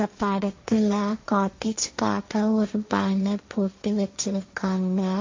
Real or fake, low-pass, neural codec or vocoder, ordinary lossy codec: fake; 7.2 kHz; codec, 16 kHz, 1.1 kbps, Voila-Tokenizer; MP3, 48 kbps